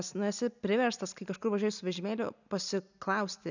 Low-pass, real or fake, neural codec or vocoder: 7.2 kHz; real; none